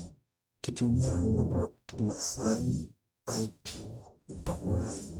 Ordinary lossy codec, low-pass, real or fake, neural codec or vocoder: none; none; fake; codec, 44.1 kHz, 0.9 kbps, DAC